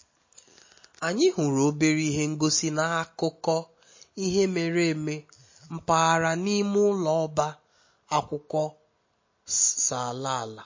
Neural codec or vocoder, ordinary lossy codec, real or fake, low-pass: none; MP3, 32 kbps; real; 7.2 kHz